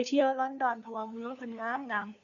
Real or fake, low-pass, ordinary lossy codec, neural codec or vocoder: fake; 7.2 kHz; none; codec, 16 kHz, 2 kbps, FunCodec, trained on LibriTTS, 25 frames a second